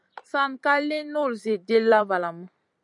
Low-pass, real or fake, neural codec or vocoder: 10.8 kHz; fake; vocoder, 44.1 kHz, 128 mel bands every 256 samples, BigVGAN v2